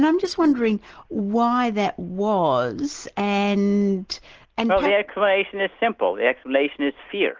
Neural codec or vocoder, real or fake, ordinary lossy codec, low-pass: none; real; Opus, 16 kbps; 7.2 kHz